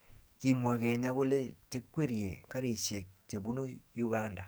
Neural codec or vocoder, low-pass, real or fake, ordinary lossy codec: codec, 44.1 kHz, 2.6 kbps, SNAC; none; fake; none